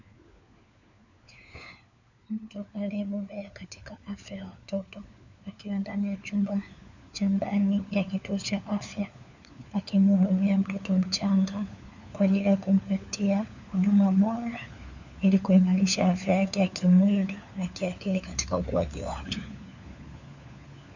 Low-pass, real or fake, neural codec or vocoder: 7.2 kHz; fake; codec, 16 kHz, 4 kbps, FunCodec, trained on LibriTTS, 50 frames a second